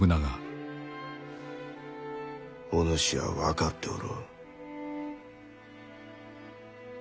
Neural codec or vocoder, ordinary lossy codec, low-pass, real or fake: none; none; none; real